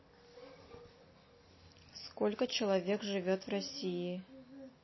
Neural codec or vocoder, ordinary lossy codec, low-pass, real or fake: none; MP3, 24 kbps; 7.2 kHz; real